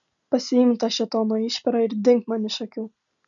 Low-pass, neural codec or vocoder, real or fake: 7.2 kHz; none; real